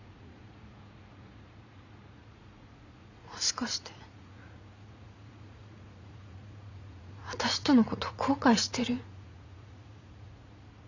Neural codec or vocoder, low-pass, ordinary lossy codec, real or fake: vocoder, 44.1 kHz, 80 mel bands, Vocos; 7.2 kHz; none; fake